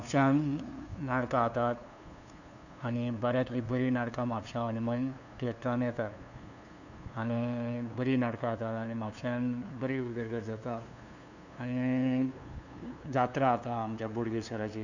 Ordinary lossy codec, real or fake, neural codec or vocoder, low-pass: none; fake; codec, 16 kHz, 2 kbps, FunCodec, trained on LibriTTS, 25 frames a second; 7.2 kHz